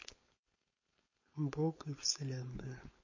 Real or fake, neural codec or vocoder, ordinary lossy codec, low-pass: fake; codec, 16 kHz, 4.8 kbps, FACodec; MP3, 32 kbps; 7.2 kHz